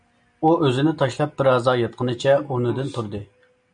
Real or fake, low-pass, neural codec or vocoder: real; 9.9 kHz; none